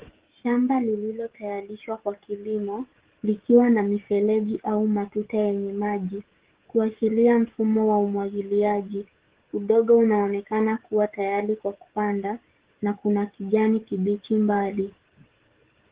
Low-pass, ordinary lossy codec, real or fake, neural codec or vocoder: 3.6 kHz; Opus, 16 kbps; fake; codec, 16 kHz, 16 kbps, FreqCodec, smaller model